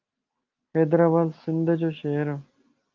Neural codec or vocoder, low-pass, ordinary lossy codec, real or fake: none; 7.2 kHz; Opus, 24 kbps; real